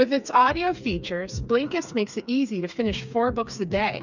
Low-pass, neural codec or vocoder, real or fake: 7.2 kHz; codec, 16 kHz, 4 kbps, FreqCodec, smaller model; fake